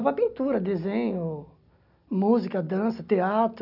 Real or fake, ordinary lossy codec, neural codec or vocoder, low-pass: real; none; none; 5.4 kHz